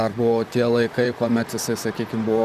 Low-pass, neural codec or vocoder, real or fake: 14.4 kHz; vocoder, 44.1 kHz, 128 mel bands, Pupu-Vocoder; fake